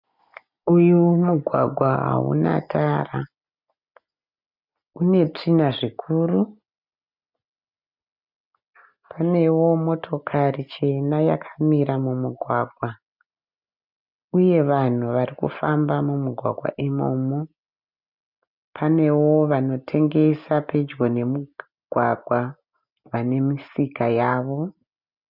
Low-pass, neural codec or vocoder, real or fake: 5.4 kHz; none; real